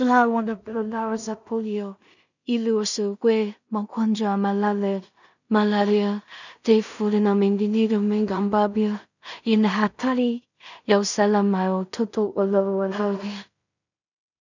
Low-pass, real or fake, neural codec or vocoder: 7.2 kHz; fake; codec, 16 kHz in and 24 kHz out, 0.4 kbps, LongCat-Audio-Codec, two codebook decoder